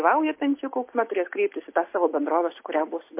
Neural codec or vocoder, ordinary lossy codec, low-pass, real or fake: none; MP3, 24 kbps; 3.6 kHz; real